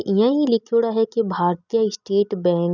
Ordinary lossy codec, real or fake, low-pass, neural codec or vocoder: none; real; 7.2 kHz; none